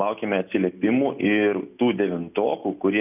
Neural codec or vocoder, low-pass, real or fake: none; 3.6 kHz; real